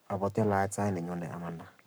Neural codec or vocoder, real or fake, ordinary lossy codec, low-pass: codec, 44.1 kHz, 7.8 kbps, Pupu-Codec; fake; none; none